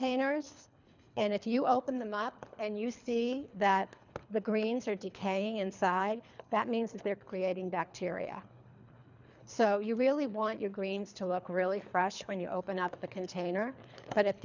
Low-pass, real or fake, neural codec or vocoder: 7.2 kHz; fake; codec, 24 kHz, 3 kbps, HILCodec